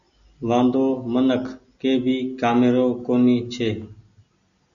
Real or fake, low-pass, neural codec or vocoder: real; 7.2 kHz; none